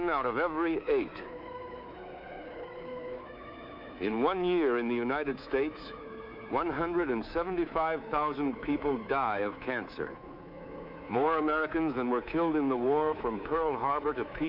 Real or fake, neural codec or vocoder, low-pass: fake; codec, 24 kHz, 3.1 kbps, DualCodec; 5.4 kHz